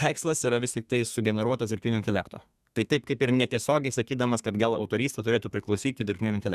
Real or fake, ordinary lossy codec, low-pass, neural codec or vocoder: fake; Opus, 64 kbps; 14.4 kHz; codec, 32 kHz, 1.9 kbps, SNAC